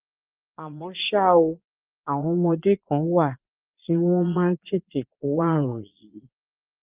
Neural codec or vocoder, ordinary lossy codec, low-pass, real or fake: vocoder, 22.05 kHz, 80 mel bands, Vocos; Opus, 24 kbps; 3.6 kHz; fake